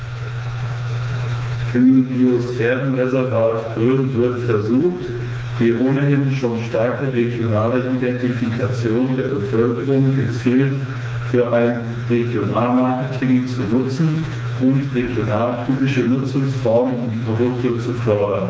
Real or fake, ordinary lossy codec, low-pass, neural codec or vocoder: fake; none; none; codec, 16 kHz, 2 kbps, FreqCodec, smaller model